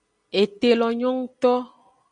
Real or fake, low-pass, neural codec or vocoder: real; 9.9 kHz; none